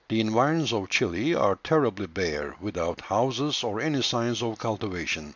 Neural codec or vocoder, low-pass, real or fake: none; 7.2 kHz; real